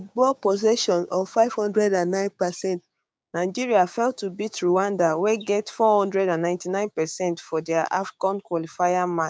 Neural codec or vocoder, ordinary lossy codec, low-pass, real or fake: codec, 16 kHz, 6 kbps, DAC; none; none; fake